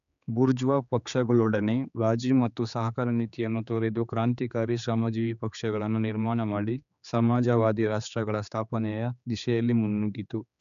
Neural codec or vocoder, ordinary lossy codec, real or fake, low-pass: codec, 16 kHz, 4 kbps, X-Codec, HuBERT features, trained on general audio; none; fake; 7.2 kHz